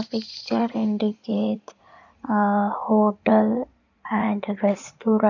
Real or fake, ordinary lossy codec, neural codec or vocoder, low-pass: fake; none; codec, 16 kHz in and 24 kHz out, 2.2 kbps, FireRedTTS-2 codec; 7.2 kHz